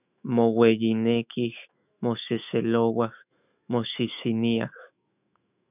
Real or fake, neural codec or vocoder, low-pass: fake; autoencoder, 48 kHz, 128 numbers a frame, DAC-VAE, trained on Japanese speech; 3.6 kHz